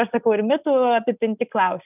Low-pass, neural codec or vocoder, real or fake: 3.6 kHz; none; real